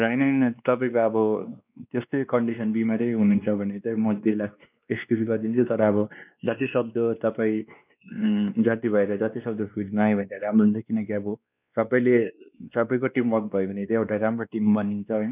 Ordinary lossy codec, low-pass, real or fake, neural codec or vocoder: none; 3.6 kHz; fake; codec, 16 kHz, 2 kbps, X-Codec, WavLM features, trained on Multilingual LibriSpeech